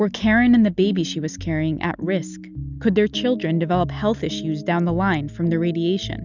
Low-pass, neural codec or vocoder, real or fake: 7.2 kHz; none; real